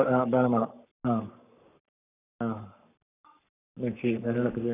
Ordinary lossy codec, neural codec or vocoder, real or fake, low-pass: none; codec, 44.1 kHz, 7.8 kbps, Pupu-Codec; fake; 3.6 kHz